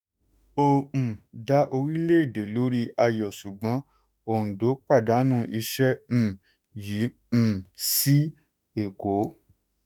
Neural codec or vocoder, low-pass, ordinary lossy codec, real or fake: autoencoder, 48 kHz, 32 numbers a frame, DAC-VAE, trained on Japanese speech; none; none; fake